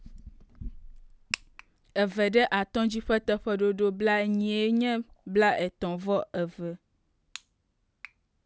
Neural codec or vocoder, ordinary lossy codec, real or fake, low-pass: none; none; real; none